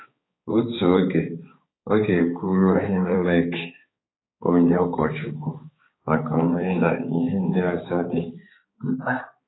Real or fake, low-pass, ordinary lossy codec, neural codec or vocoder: fake; 7.2 kHz; AAC, 16 kbps; codec, 16 kHz, 4 kbps, X-Codec, HuBERT features, trained on balanced general audio